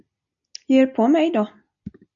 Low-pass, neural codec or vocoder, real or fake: 7.2 kHz; none; real